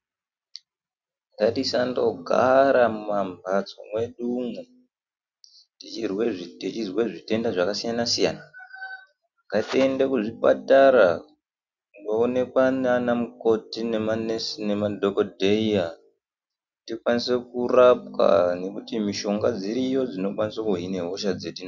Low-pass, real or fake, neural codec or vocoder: 7.2 kHz; real; none